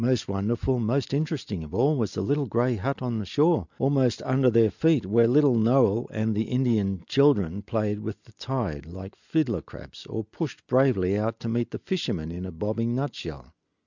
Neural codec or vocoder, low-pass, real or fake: none; 7.2 kHz; real